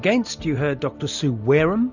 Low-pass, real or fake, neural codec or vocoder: 7.2 kHz; real; none